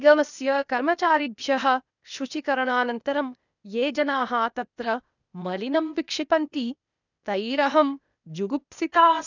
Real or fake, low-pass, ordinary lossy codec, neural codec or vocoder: fake; 7.2 kHz; none; codec, 16 kHz, 0.8 kbps, ZipCodec